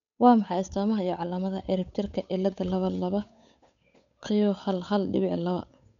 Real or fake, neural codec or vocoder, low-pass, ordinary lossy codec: fake; codec, 16 kHz, 8 kbps, FunCodec, trained on Chinese and English, 25 frames a second; 7.2 kHz; none